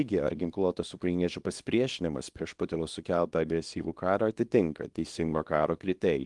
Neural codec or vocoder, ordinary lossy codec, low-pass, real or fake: codec, 24 kHz, 0.9 kbps, WavTokenizer, small release; Opus, 24 kbps; 10.8 kHz; fake